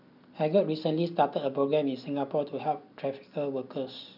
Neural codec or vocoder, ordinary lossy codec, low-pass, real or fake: none; none; 5.4 kHz; real